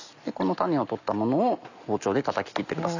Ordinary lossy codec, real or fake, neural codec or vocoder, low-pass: none; real; none; 7.2 kHz